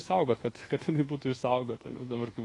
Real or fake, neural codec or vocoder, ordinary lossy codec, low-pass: fake; codec, 24 kHz, 1.2 kbps, DualCodec; AAC, 32 kbps; 10.8 kHz